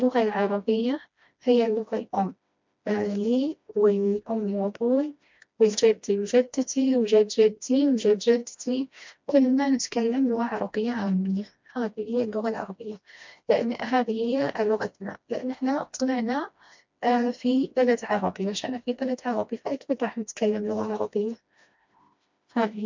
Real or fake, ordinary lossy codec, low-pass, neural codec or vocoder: fake; MP3, 64 kbps; 7.2 kHz; codec, 16 kHz, 1 kbps, FreqCodec, smaller model